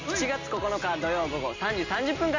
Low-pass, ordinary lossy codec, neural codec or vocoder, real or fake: 7.2 kHz; MP3, 64 kbps; none; real